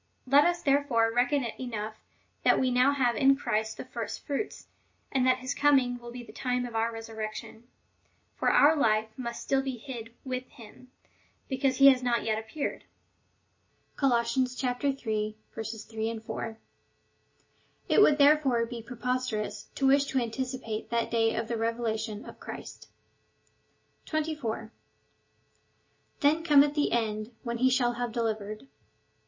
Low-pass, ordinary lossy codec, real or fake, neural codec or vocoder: 7.2 kHz; MP3, 32 kbps; real; none